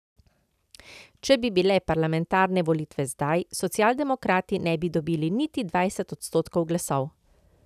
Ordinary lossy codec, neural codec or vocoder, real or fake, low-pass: none; none; real; 14.4 kHz